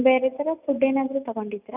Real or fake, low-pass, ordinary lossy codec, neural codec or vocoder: real; 3.6 kHz; none; none